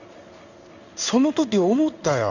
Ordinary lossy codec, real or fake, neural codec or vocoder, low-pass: none; fake; codec, 16 kHz in and 24 kHz out, 1 kbps, XY-Tokenizer; 7.2 kHz